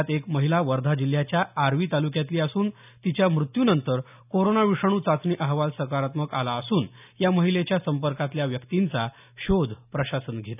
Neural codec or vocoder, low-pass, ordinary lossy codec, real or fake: none; 3.6 kHz; none; real